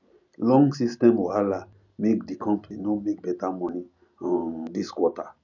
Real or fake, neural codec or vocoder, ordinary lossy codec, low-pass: real; none; none; 7.2 kHz